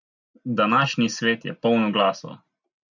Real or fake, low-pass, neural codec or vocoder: real; 7.2 kHz; none